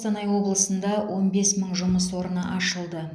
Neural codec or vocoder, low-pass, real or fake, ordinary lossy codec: none; none; real; none